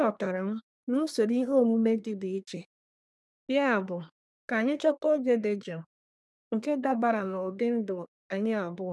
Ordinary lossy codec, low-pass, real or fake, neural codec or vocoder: none; none; fake; codec, 24 kHz, 1 kbps, SNAC